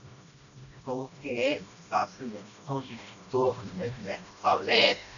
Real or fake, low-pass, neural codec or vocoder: fake; 7.2 kHz; codec, 16 kHz, 1 kbps, FreqCodec, smaller model